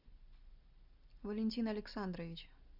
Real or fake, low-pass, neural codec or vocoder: real; 5.4 kHz; none